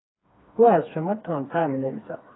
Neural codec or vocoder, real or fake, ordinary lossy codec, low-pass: codec, 16 kHz, 2 kbps, FreqCodec, smaller model; fake; AAC, 16 kbps; 7.2 kHz